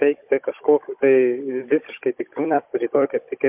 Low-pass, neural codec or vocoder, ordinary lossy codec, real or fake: 3.6 kHz; codec, 16 kHz, 16 kbps, FunCodec, trained on Chinese and English, 50 frames a second; MP3, 32 kbps; fake